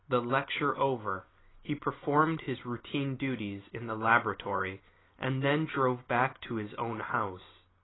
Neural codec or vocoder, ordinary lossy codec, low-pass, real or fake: none; AAC, 16 kbps; 7.2 kHz; real